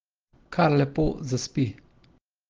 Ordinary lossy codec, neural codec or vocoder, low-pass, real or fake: Opus, 16 kbps; none; 7.2 kHz; real